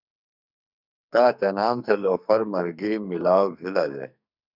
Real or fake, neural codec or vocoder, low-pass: fake; codec, 44.1 kHz, 2.6 kbps, SNAC; 5.4 kHz